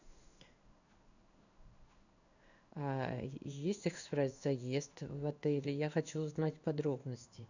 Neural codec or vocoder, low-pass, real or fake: codec, 16 kHz in and 24 kHz out, 1 kbps, XY-Tokenizer; 7.2 kHz; fake